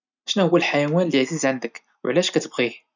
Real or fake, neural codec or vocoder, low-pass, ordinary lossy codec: real; none; 7.2 kHz; none